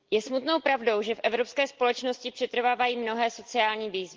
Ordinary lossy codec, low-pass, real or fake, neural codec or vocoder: Opus, 16 kbps; 7.2 kHz; real; none